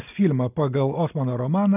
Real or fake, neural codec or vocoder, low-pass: real; none; 3.6 kHz